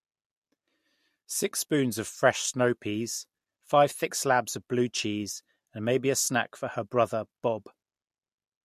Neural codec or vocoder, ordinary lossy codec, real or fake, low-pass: none; MP3, 64 kbps; real; 14.4 kHz